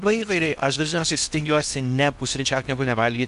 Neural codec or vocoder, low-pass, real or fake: codec, 16 kHz in and 24 kHz out, 0.8 kbps, FocalCodec, streaming, 65536 codes; 10.8 kHz; fake